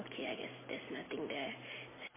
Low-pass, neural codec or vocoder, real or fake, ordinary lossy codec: 3.6 kHz; none; real; MP3, 16 kbps